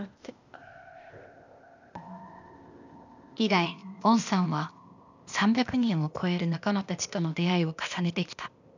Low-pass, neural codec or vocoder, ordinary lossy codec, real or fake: 7.2 kHz; codec, 16 kHz, 0.8 kbps, ZipCodec; none; fake